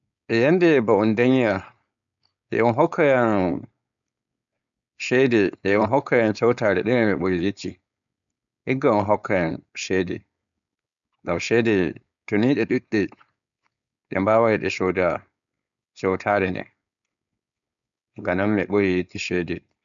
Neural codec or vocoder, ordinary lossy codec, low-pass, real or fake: codec, 16 kHz, 4.8 kbps, FACodec; none; 7.2 kHz; fake